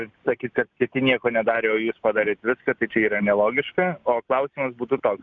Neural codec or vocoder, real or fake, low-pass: none; real; 7.2 kHz